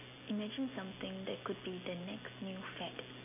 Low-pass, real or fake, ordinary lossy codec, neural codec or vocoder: 3.6 kHz; real; none; none